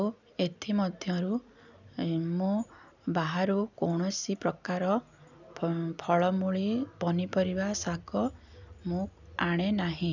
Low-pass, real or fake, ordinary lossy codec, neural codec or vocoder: 7.2 kHz; real; none; none